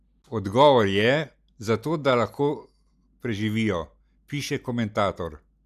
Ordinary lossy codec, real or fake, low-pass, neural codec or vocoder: none; real; 14.4 kHz; none